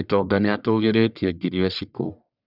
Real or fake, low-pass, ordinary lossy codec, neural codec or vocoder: fake; 5.4 kHz; none; codec, 44.1 kHz, 1.7 kbps, Pupu-Codec